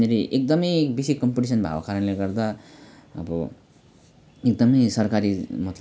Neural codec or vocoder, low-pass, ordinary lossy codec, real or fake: none; none; none; real